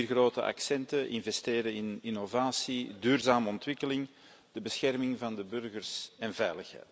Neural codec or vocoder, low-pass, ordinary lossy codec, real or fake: none; none; none; real